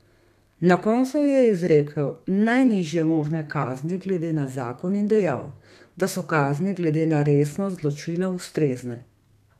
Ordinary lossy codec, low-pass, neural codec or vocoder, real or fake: none; 14.4 kHz; codec, 32 kHz, 1.9 kbps, SNAC; fake